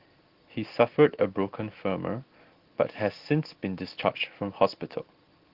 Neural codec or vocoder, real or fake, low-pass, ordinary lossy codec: none; real; 5.4 kHz; Opus, 16 kbps